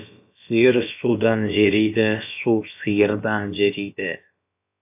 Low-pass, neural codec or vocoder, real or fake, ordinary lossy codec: 3.6 kHz; codec, 16 kHz, about 1 kbps, DyCAST, with the encoder's durations; fake; AAC, 32 kbps